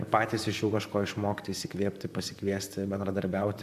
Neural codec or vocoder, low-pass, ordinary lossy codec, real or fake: vocoder, 44.1 kHz, 128 mel bands, Pupu-Vocoder; 14.4 kHz; AAC, 96 kbps; fake